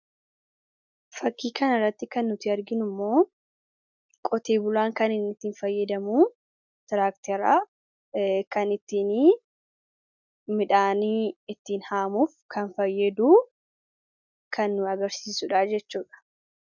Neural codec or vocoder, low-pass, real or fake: none; 7.2 kHz; real